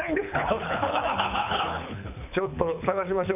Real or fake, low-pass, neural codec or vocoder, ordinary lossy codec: fake; 3.6 kHz; codec, 24 kHz, 6 kbps, HILCodec; none